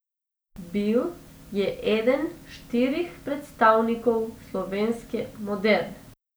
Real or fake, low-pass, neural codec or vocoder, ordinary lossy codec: real; none; none; none